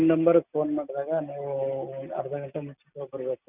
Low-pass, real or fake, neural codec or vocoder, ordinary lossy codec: 3.6 kHz; real; none; none